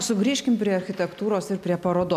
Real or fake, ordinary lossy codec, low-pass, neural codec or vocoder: real; MP3, 96 kbps; 14.4 kHz; none